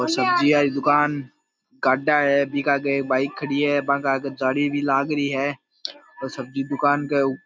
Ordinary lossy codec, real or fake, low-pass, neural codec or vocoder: none; real; none; none